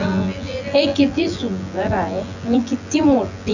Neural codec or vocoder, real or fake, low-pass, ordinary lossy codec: vocoder, 24 kHz, 100 mel bands, Vocos; fake; 7.2 kHz; none